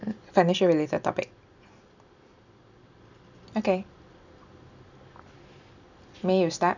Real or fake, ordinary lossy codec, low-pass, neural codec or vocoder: real; none; 7.2 kHz; none